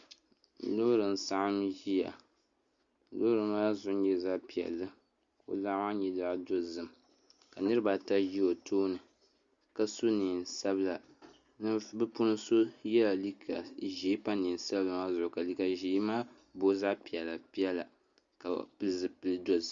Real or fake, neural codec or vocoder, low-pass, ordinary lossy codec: real; none; 7.2 kHz; Opus, 64 kbps